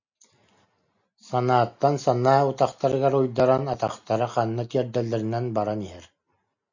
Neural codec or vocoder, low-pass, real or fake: none; 7.2 kHz; real